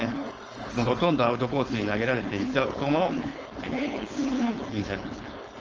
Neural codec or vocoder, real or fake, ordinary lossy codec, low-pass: codec, 16 kHz, 4.8 kbps, FACodec; fake; Opus, 24 kbps; 7.2 kHz